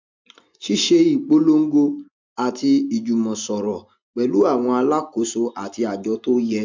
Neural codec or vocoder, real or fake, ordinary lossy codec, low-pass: none; real; MP3, 64 kbps; 7.2 kHz